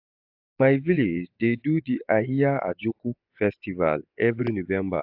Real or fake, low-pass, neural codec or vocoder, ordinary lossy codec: fake; 5.4 kHz; vocoder, 24 kHz, 100 mel bands, Vocos; AAC, 48 kbps